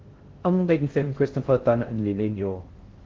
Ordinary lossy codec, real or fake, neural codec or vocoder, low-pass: Opus, 16 kbps; fake; codec, 16 kHz in and 24 kHz out, 0.6 kbps, FocalCodec, streaming, 4096 codes; 7.2 kHz